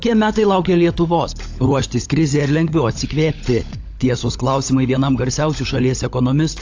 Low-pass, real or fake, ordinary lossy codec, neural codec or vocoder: 7.2 kHz; fake; AAC, 48 kbps; codec, 16 kHz, 16 kbps, FunCodec, trained on LibriTTS, 50 frames a second